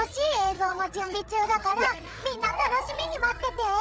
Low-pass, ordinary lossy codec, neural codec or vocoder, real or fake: none; none; codec, 16 kHz, 8 kbps, FreqCodec, larger model; fake